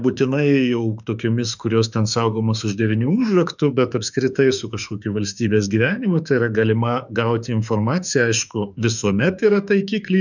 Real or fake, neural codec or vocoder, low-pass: fake; autoencoder, 48 kHz, 32 numbers a frame, DAC-VAE, trained on Japanese speech; 7.2 kHz